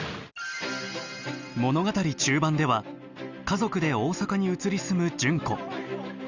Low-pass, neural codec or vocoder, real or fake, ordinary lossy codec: 7.2 kHz; none; real; Opus, 64 kbps